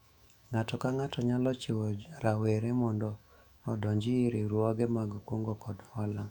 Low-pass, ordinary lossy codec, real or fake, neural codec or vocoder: 19.8 kHz; none; fake; autoencoder, 48 kHz, 128 numbers a frame, DAC-VAE, trained on Japanese speech